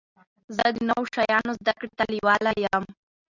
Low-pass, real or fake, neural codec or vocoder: 7.2 kHz; real; none